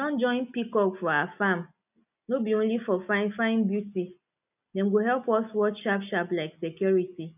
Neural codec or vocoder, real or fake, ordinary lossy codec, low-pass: none; real; none; 3.6 kHz